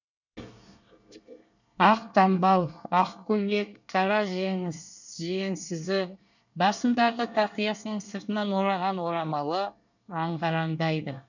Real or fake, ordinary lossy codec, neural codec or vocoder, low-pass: fake; none; codec, 24 kHz, 1 kbps, SNAC; 7.2 kHz